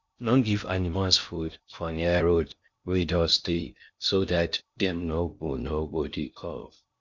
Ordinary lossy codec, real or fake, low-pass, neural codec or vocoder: Opus, 64 kbps; fake; 7.2 kHz; codec, 16 kHz in and 24 kHz out, 0.6 kbps, FocalCodec, streaming, 2048 codes